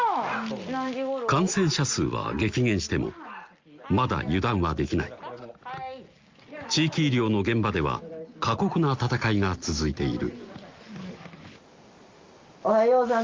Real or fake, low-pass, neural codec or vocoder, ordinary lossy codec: real; 7.2 kHz; none; Opus, 32 kbps